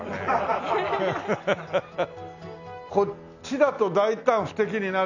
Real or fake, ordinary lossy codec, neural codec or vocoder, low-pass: real; none; none; 7.2 kHz